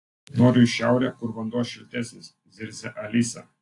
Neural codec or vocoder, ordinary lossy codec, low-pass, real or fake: none; AAC, 48 kbps; 10.8 kHz; real